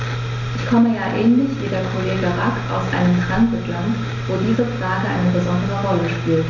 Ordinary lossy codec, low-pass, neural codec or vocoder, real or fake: none; 7.2 kHz; none; real